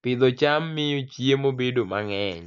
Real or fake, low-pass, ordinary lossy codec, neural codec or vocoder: real; 7.2 kHz; none; none